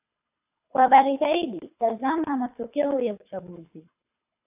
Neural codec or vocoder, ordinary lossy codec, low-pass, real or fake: codec, 24 kHz, 3 kbps, HILCodec; AAC, 32 kbps; 3.6 kHz; fake